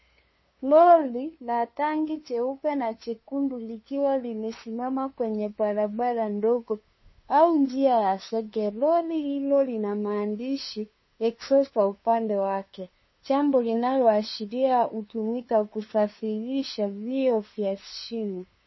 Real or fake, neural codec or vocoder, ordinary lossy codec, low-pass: fake; codec, 16 kHz, 2 kbps, FunCodec, trained on LibriTTS, 25 frames a second; MP3, 24 kbps; 7.2 kHz